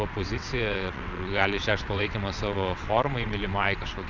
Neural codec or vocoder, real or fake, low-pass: vocoder, 22.05 kHz, 80 mel bands, WaveNeXt; fake; 7.2 kHz